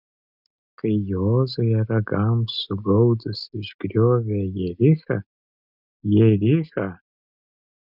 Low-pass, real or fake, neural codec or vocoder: 5.4 kHz; real; none